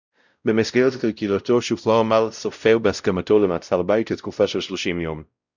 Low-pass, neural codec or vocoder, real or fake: 7.2 kHz; codec, 16 kHz, 0.5 kbps, X-Codec, WavLM features, trained on Multilingual LibriSpeech; fake